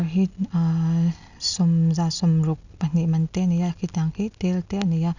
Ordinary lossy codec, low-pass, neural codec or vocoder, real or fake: none; 7.2 kHz; none; real